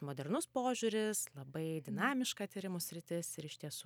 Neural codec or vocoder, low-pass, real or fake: vocoder, 44.1 kHz, 128 mel bands every 256 samples, BigVGAN v2; 19.8 kHz; fake